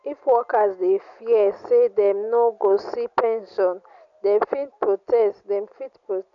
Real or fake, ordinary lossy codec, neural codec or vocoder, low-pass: real; none; none; 7.2 kHz